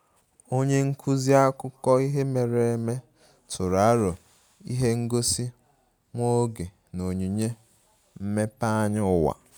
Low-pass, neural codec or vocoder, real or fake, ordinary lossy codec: none; none; real; none